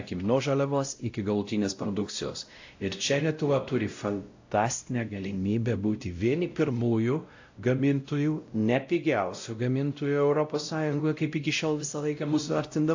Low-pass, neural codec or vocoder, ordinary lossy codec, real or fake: 7.2 kHz; codec, 16 kHz, 0.5 kbps, X-Codec, WavLM features, trained on Multilingual LibriSpeech; AAC, 48 kbps; fake